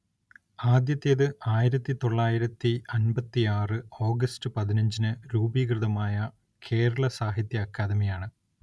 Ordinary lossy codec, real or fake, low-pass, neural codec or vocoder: none; real; none; none